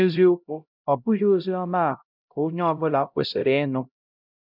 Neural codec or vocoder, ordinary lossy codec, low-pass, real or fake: codec, 16 kHz, 0.5 kbps, X-Codec, HuBERT features, trained on LibriSpeech; none; 5.4 kHz; fake